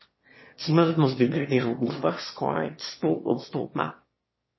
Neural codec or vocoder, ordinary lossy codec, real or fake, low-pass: autoencoder, 22.05 kHz, a latent of 192 numbers a frame, VITS, trained on one speaker; MP3, 24 kbps; fake; 7.2 kHz